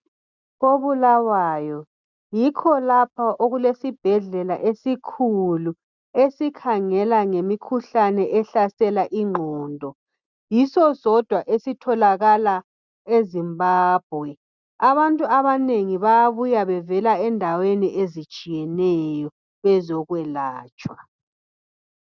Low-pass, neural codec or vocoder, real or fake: 7.2 kHz; none; real